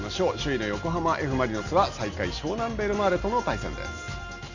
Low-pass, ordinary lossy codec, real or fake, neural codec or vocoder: 7.2 kHz; none; real; none